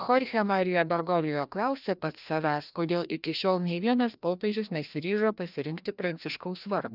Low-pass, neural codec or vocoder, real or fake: 5.4 kHz; codec, 16 kHz, 1 kbps, FreqCodec, larger model; fake